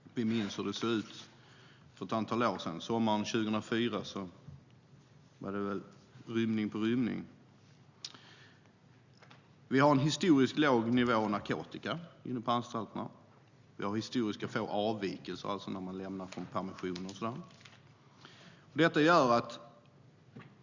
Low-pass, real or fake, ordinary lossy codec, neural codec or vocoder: 7.2 kHz; real; Opus, 64 kbps; none